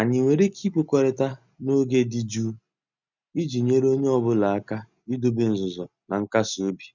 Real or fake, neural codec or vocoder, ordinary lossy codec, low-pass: real; none; none; 7.2 kHz